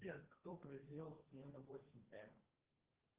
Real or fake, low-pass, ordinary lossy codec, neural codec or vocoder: fake; 3.6 kHz; Opus, 16 kbps; codec, 16 kHz, 1.1 kbps, Voila-Tokenizer